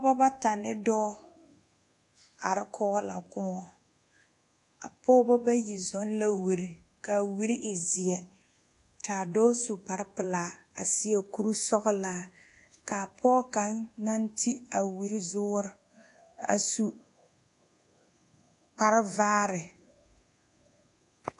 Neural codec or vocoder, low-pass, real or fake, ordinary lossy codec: codec, 24 kHz, 1.2 kbps, DualCodec; 10.8 kHz; fake; AAC, 48 kbps